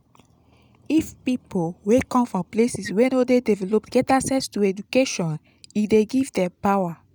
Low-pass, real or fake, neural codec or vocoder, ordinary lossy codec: none; real; none; none